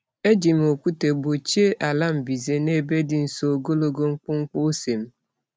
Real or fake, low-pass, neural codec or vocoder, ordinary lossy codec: real; none; none; none